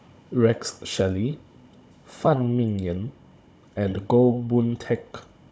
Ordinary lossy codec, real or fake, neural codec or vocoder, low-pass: none; fake; codec, 16 kHz, 16 kbps, FunCodec, trained on Chinese and English, 50 frames a second; none